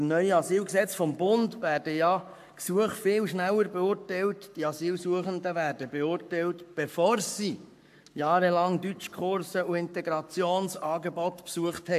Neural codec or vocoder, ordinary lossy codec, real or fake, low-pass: codec, 44.1 kHz, 7.8 kbps, Pupu-Codec; AAC, 96 kbps; fake; 14.4 kHz